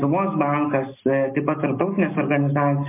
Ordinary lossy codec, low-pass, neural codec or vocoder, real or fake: MP3, 24 kbps; 3.6 kHz; none; real